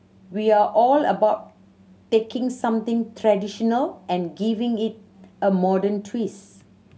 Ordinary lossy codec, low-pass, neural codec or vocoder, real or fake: none; none; none; real